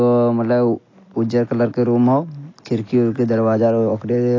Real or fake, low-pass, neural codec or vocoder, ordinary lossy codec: real; 7.2 kHz; none; AAC, 32 kbps